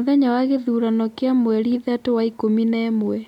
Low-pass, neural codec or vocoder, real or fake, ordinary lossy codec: 19.8 kHz; none; real; none